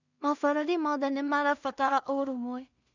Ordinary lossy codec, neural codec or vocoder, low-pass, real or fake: none; codec, 16 kHz in and 24 kHz out, 0.4 kbps, LongCat-Audio-Codec, two codebook decoder; 7.2 kHz; fake